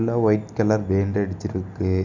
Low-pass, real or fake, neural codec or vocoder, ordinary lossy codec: 7.2 kHz; real; none; none